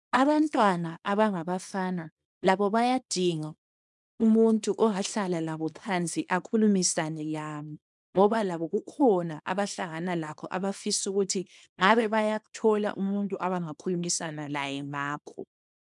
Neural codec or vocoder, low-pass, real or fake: codec, 24 kHz, 0.9 kbps, WavTokenizer, small release; 10.8 kHz; fake